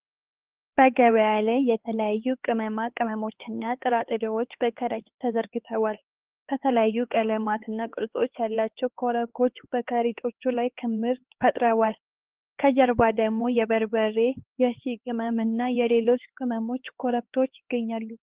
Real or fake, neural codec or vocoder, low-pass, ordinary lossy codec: fake; codec, 16 kHz, 4 kbps, X-Codec, HuBERT features, trained on LibriSpeech; 3.6 kHz; Opus, 16 kbps